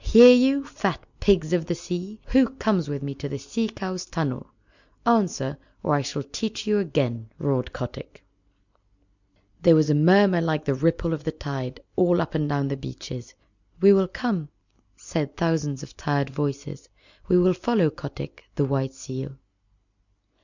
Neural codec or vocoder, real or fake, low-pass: none; real; 7.2 kHz